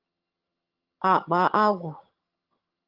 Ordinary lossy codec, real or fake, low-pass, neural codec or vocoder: Opus, 24 kbps; fake; 5.4 kHz; vocoder, 22.05 kHz, 80 mel bands, HiFi-GAN